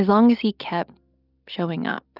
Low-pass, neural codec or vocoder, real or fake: 5.4 kHz; none; real